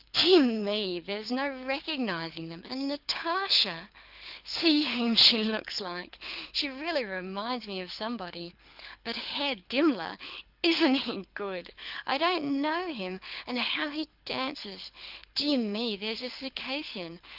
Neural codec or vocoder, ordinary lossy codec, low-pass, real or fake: codec, 24 kHz, 6 kbps, HILCodec; Opus, 24 kbps; 5.4 kHz; fake